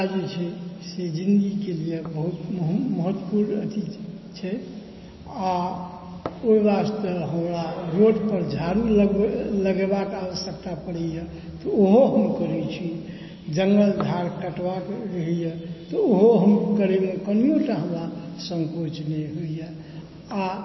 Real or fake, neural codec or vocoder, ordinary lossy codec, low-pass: real; none; MP3, 24 kbps; 7.2 kHz